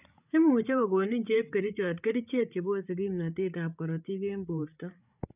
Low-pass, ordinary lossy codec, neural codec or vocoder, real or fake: 3.6 kHz; none; codec, 16 kHz, 8 kbps, FreqCodec, larger model; fake